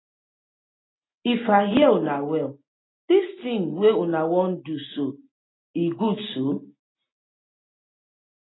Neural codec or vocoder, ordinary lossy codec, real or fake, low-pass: none; AAC, 16 kbps; real; 7.2 kHz